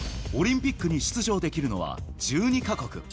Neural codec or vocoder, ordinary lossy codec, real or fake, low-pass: none; none; real; none